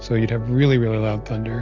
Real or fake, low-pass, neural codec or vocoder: real; 7.2 kHz; none